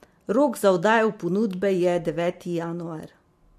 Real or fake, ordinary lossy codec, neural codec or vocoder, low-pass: real; MP3, 64 kbps; none; 14.4 kHz